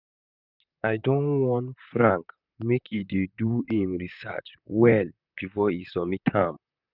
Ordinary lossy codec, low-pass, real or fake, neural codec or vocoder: none; 5.4 kHz; fake; vocoder, 44.1 kHz, 128 mel bands, Pupu-Vocoder